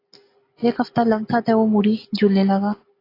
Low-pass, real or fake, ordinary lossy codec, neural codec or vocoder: 5.4 kHz; real; AAC, 24 kbps; none